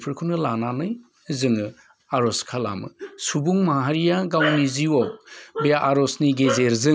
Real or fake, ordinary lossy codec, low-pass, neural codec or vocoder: real; none; none; none